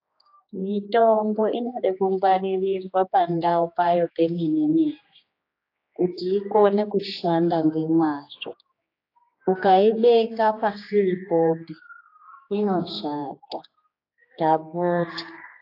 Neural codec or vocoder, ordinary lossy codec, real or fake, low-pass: codec, 16 kHz, 2 kbps, X-Codec, HuBERT features, trained on general audio; AAC, 32 kbps; fake; 5.4 kHz